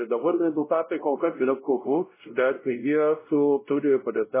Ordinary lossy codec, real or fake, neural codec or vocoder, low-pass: MP3, 16 kbps; fake; codec, 16 kHz, 0.5 kbps, X-Codec, WavLM features, trained on Multilingual LibriSpeech; 3.6 kHz